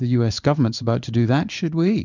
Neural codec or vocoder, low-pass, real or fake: codec, 16 kHz in and 24 kHz out, 1 kbps, XY-Tokenizer; 7.2 kHz; fake